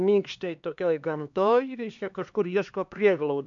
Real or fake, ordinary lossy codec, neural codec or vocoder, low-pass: fake; AAC, 64 kbps; codec, 16 kHz, 2 kbps, X-Codec, HuBERT features, trained on LibriSpeech; 7.2 kHz